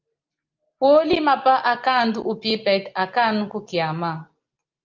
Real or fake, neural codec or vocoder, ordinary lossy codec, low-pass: real; none; Opus, 24 kbps; 7.2 kHz